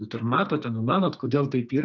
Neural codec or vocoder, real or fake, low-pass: codec, 44.1 kHz, 2.6 kbps, SNAC; fake; 7.2 kHz